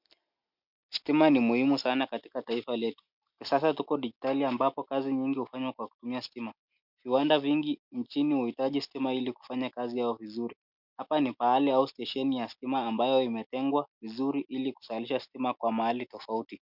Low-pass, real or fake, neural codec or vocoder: 5.4 kHz; real; none